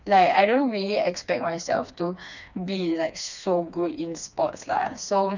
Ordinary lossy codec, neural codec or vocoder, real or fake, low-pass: none; codec, 16 kHz, 2 kbps, FreqCodec, smaller model; fake; 7.2 kHz